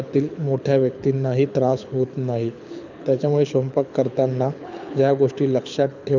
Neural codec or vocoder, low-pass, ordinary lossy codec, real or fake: codec, 24 kHz, 6 kbps, HILCodec; 7.2 kHz; none; fake